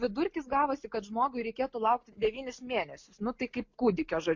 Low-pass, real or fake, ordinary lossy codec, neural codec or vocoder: 7.2 kHz; real; MP3, 48 kbps; none